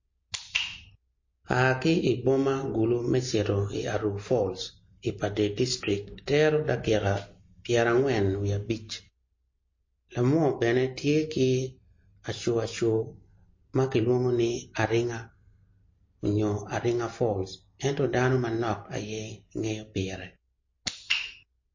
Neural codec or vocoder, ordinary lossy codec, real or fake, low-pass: none; MP3, 32 kbps; real; 7.2 kHz